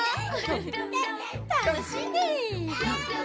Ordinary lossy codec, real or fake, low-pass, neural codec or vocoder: none; real; none; none